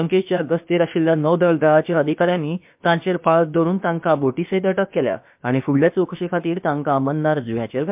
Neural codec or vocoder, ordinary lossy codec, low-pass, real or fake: codec, 16 kHz, about 1 kbps, DyCAST, with the encoder's durations; MP3, 32 kbps; 3.6 kHz; fake